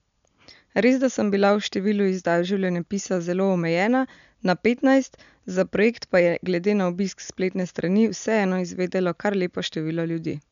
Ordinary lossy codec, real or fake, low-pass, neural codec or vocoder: none; real; 7.2 kHz; none